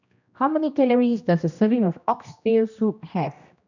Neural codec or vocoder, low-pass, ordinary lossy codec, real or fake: codec, 16 kHz, 1 kbps, X-Codec, HuBERT features, trained on general audio; 7.2 kHz; none; fake